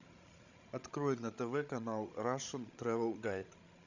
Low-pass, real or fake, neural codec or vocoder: 7.2 kHz; fake; codec, 16 kHz, 16 kbps, FreqCodec, larger model